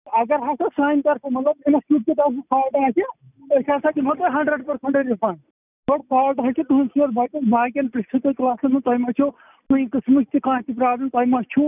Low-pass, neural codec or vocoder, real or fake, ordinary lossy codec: 3.6 kHz; none; real; none